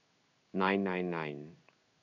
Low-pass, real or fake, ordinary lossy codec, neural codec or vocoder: 7.2 kHz; fake; none; codec, 16 kHz in and 24 kHz out, 1 kbps, XY-Tokenizer